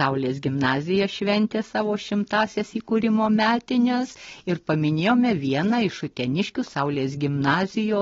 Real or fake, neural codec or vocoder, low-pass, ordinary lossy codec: real; none; 7.2 kHz; AAC, 24 kbps